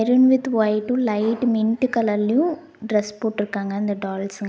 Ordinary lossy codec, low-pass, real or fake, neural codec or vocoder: none; none; real; none